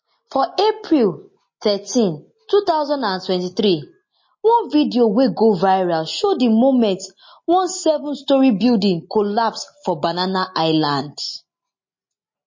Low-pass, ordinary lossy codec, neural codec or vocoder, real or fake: 7.2 kHz; MP3, 32 kbps; none; real